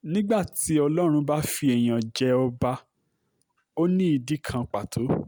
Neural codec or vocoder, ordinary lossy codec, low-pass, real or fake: none; none; none; real